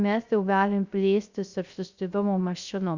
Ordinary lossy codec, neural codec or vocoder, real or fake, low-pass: Opus, 64 kbps; codec, 16 kHz, 0.3 kbps, FocalCodec; fake; 7.2 kHz